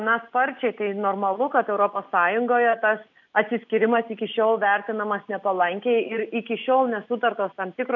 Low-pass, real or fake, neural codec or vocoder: 7.2 kHz; real; none